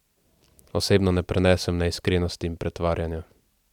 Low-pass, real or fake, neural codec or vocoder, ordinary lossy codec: 19.8 kHz; real; none; none